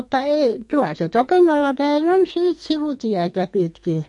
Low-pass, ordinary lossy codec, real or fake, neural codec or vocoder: 10.8 kHz; MP3, 48 kbps; fake; codec, 44.1 kHz, 2.6 kbps, SNAC